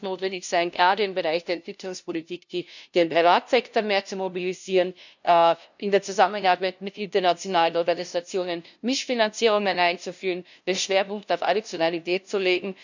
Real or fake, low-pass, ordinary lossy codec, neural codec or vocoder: fake; 7.2 kHz; none; codec, 16 kHz, 0.5 kbps, FunCodec, trained on LibriTTS, 25 frames a second